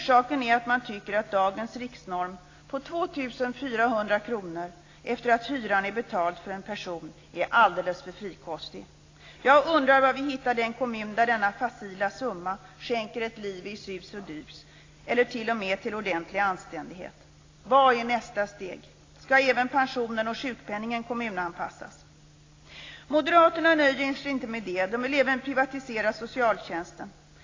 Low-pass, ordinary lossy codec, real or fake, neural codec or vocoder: 7.2 kHz; AAC, 32 kbps; real; none